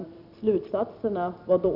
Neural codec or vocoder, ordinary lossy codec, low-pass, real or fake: vocoder, 44.1 kHz, 128 mel bands every 256 samples, BigVGAN v2; none; 5.4 kHz; fake